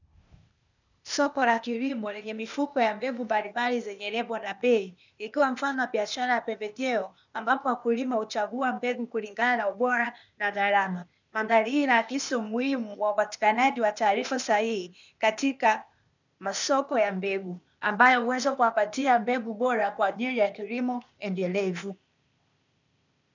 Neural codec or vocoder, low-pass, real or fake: codec, 16 kHz, 0.8 kbps, ZipCodec; 7.2 kHz; fake